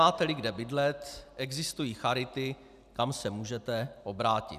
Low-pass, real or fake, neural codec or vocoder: 14.4 kHz; real; none